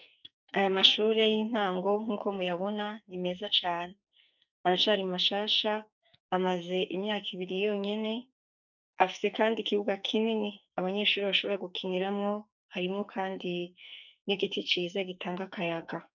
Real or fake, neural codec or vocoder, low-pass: fake; codec, 44.1 kHz, 2.6 kbps, SNAC; 7.2 kHz